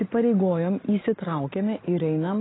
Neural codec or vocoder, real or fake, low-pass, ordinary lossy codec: none; real; 7.2 kHz; AAC, 16 kbps